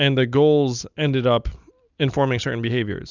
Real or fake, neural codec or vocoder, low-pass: real; none; 7.2 kHz